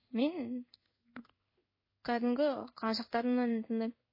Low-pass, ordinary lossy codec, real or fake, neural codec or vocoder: 5.4 kHz; MP3, 24 kbps; fake; codec, 16 kHz in and 24 kHz out, 1 kbps, XY-Tokenizer